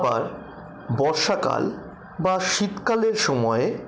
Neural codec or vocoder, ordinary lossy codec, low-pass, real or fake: none; none; none; real